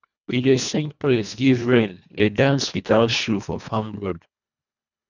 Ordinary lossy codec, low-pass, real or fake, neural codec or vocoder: none; 7.2 kHz; fake; codec, 24 kHz, 1.5 kbps, HILCodec